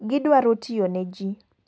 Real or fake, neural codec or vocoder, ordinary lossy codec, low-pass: real; none; none; none